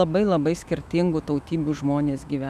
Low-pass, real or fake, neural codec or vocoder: 14.4 kHz; fake; autoencoder, 48 kHz, 128 numbers a frame, DAC-VAE, trained on Japanese speech